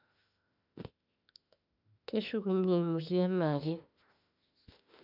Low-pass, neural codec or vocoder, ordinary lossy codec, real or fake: 5.4 kHz; autoencoder, 48 kHz, 32 numbers a frame, DAC-VAE, trained on Japanese speech; none; fake